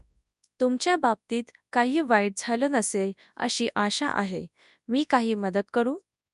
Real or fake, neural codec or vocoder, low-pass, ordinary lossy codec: fake; codec, 24 kHz, 0.9 kbps, WavTokenizer, large speech release; 10.8 kHz; none